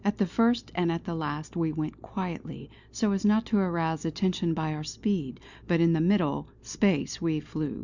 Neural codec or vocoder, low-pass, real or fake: none; 7.2 kHz; real